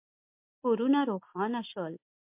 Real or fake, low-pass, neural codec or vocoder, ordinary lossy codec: real; 3.6 kHz; none; MP3, 32 kbps